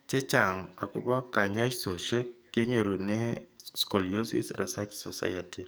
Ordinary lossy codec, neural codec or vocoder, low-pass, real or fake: none; codec, 44.1 kHz, 2.6 kbps, SNAC; none; fake